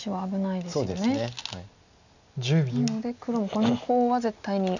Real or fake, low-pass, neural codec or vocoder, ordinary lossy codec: real; 7.2 kHz; none; none